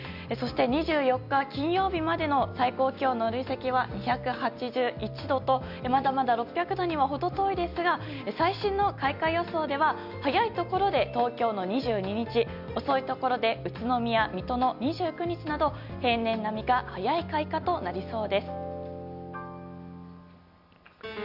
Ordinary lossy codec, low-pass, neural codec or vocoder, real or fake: none; 5.4 kHz; none; real